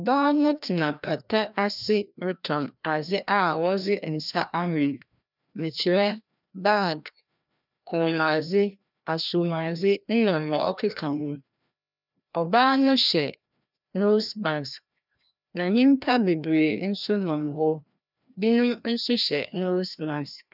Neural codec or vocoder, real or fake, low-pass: codec, 16 kHz, 1 kbps, FreqCodec, larger model; fake; 5.4 kHz